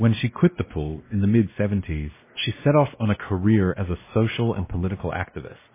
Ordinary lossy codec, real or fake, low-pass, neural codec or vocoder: MP3, 16 kbps; fake; 3.6 kHz; codec, 16 kHz, 1 kbps, X-Codec, WavLM features, trained on Multilingual LibriSpeech